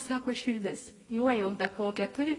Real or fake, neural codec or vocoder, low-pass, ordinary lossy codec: fake; codec, 24 kHz, 0.9 kbps, WavTokenizer, medium music audio release; 10.8 kHz; AAC, 32 kbps